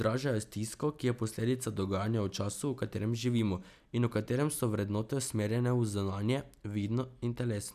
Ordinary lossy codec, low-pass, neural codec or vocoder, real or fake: none; 14.4 kHz; none; real